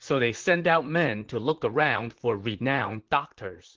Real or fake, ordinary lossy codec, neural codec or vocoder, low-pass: fake; Opus, 16 kbps; vocoder, 44.1 kHz, 128 mel bands, Pupu-Vocoder; 7.2 kHz